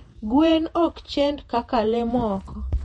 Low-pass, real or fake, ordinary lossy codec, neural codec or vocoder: 19.8 kHz; fake; MP3, 48 kbps; vocoder, 48 kHz, 128 mel bands, Vocos